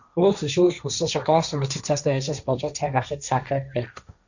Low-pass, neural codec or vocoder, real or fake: 7.2 kHz; codec, 16 kHz, 1.1 kbps, Voila-Tokenizer; fake